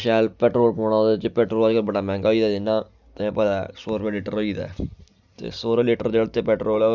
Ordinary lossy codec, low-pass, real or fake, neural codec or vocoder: none; 7.2 kHz; real; none